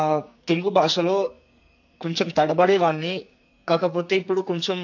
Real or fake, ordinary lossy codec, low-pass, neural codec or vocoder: fake; AAC, 48 kbps; 7.2 kHz; codec, 44.1 kHz, 2.6 kbps, SNAC